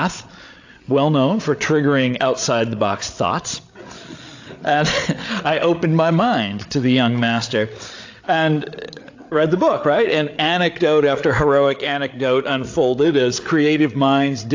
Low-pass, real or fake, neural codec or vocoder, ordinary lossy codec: 7.2 kHz; fake; codec, 16 kHz, 8 kbps, FreqCodec, larger model; AAC, 48 kbps